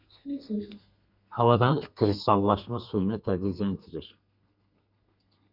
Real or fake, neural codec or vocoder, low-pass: fake; codec, 32 kHz, 1.9 kbps, SNAC; 5.4 kHz